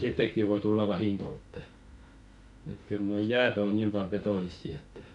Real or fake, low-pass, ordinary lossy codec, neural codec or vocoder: fake; 10.8 kHz; AAC, 64 kbps; autoencoder, 48 kHz, 32 numbers a frame, DAC-VAE, trained on Japanese speech